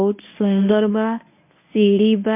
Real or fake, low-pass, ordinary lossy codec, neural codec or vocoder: fake; 3.6 kHz; MP3, 24 kbps; codec, 24 kHz, 0.9 kbps, WavTokenizer, medium speech release version 1